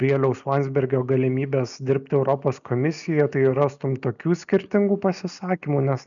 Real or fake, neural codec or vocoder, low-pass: real; none; 7.2 kHz